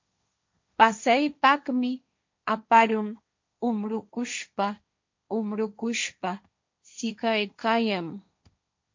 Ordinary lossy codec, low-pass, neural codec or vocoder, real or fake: MP3, 48 kbps; 7.2 kHz; codec, 16 kHz, 1.1 kbps, Voila-Tokenizer; fake